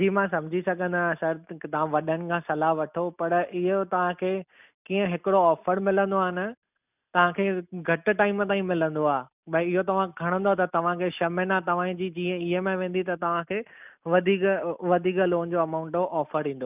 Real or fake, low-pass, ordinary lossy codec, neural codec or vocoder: real; 3.6 kHz; none; none